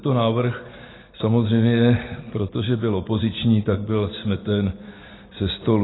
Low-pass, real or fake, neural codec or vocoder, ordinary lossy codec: 7.2 kHz; real; none; AAC, 16 kbps